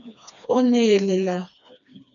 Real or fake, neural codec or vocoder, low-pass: fake; codec, 16 kHz, 2 kbps, FreqCodec, smaller model; 7.2 kHz